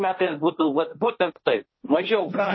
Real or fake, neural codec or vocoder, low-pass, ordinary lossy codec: fake; codec, 16 kHz, 1.1 kbps, Voila-Tokenizer; 7.2 kHz; MP3, 24 kbps